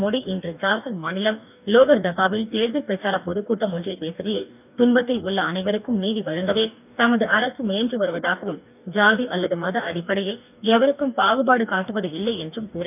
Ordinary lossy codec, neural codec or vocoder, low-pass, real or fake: none; codec, 44.1 kHz, 2.6 kbps, DAC; 3.6 kHz; fake